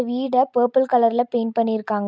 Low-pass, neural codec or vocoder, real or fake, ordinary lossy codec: none; none; real; none